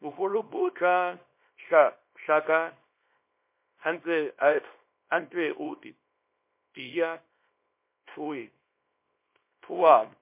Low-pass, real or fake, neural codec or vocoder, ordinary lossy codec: 3.6 kHz; fake; codec, 24 kHz, 0.9 kbps, WavTokenizer, small release; MP3, 24 kbps